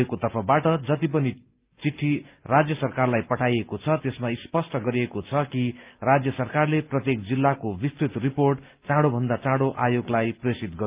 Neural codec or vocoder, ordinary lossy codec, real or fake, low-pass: none; Opus, 24 kbps; real; 3.6 kHz